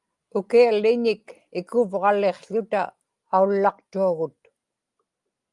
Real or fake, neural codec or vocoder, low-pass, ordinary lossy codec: real; none; 10.8 kHz; Opus, 32 kbps